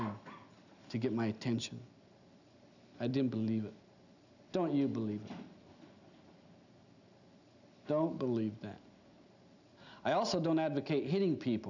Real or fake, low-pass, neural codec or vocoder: real; 7.2 kHz; none